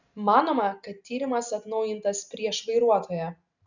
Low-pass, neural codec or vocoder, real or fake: 7.2 kHz; none; real